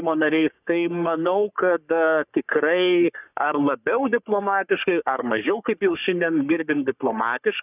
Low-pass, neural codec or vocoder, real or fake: 3.6 kHz; codec, 44.1 kHz, 3.4 kbps, Pupu-Codec; fake